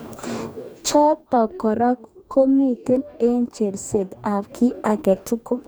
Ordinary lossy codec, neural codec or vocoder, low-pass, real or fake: none; codec, 44.1 kHz, 2.6 kbps, DAC; none; fake